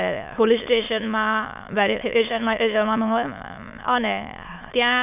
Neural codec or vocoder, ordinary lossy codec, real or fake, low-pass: autoencoder, 22.05 kHz, a latent of 192 numbers a frame, VITS, trained on many speakers; none; fake; 3.6 kHz